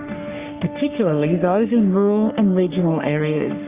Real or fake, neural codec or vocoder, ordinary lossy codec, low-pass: fake; codec, 44.1 kHz, 3.4 kbps, Pupu-Codec; Opus, 64 kbps; 3.6 kHz